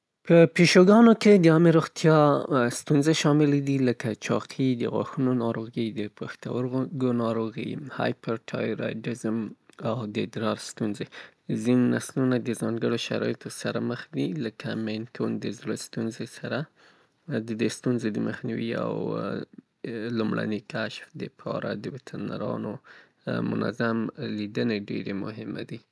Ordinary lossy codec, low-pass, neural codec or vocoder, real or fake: none; none; none; real